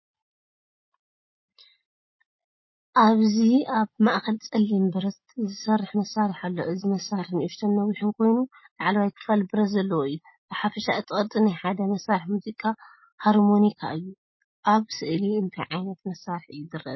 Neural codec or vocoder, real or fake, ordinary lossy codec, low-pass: none; real; MP3, 24 kbps; 7.2 kHz